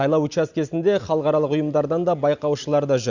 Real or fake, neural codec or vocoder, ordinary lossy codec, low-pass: real; none; Opus, 64 kbps; 7.2 kHz